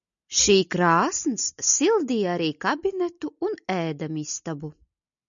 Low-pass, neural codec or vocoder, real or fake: 7.2 kHz; none; real